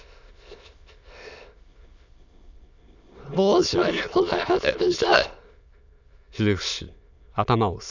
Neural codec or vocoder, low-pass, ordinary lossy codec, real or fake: autoencoder, 22.05 kHz, a latent of 192 numbers a frame, VITS, trained on many speakers; 7.2 kHz; none; fake